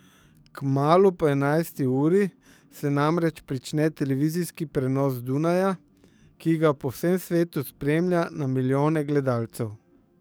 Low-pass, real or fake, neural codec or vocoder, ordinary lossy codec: none; fake; codec, 44.1 kHz, 7.8 kbps, DAC; none